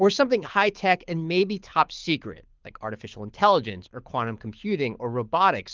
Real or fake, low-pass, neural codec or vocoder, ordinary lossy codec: fake; 7.2 kHz; codec, 24 kHz, 6 kbps, HILCodec; Opus, 32 kbps